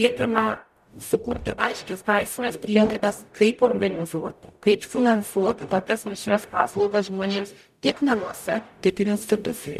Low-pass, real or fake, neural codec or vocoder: 14.4 kHz; fake; codec, 44.1 kHz, 0.9 kbps, DAC